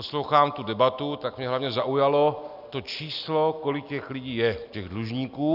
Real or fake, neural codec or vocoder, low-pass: real; none; 5.4 kHz